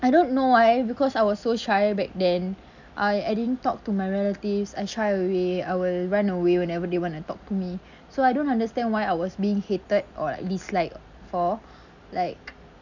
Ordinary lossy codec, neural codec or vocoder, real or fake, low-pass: none; none; real; 7.2 kHz